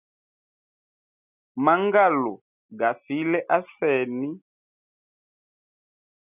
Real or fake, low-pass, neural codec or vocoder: real; 3.6 kHz; none